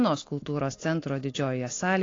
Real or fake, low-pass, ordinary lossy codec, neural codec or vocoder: real; 7.2 kHz; AAC, 32 kbps; none